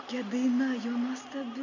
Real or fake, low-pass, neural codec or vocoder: real; 7.2 kHz; none